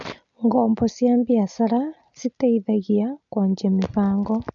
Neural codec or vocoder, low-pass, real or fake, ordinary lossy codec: none; 7.2 kHz; real; none